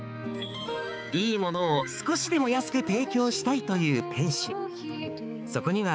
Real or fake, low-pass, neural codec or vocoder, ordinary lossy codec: fake; none; codec, 16 kHz, 4 kbps, X-Codec, HuBERT features, trained on balanced general audio; none